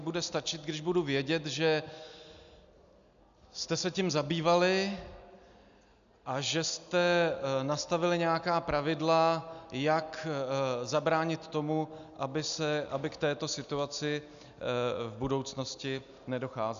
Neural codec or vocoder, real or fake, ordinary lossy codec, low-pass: none; real; AAC, 96 kbps; 7.2 kHz